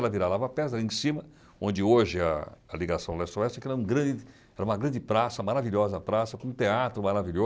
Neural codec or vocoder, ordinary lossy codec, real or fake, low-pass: none; none; real; none